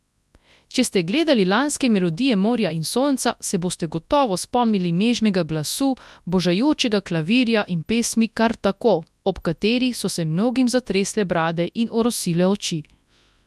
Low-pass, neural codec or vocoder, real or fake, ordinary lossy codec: none; codec, 24 kHz, 0.9 kbps, WavTokenizer, large speech release; fake; none